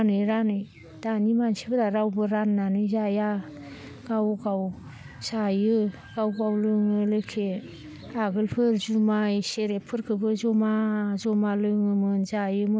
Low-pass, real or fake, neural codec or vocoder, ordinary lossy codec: none; real; none; none